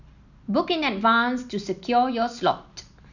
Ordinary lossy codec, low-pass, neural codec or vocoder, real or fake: none; 7.2 kHz; none; real